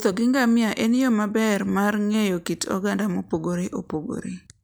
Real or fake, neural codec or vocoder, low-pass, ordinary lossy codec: real; none; none; none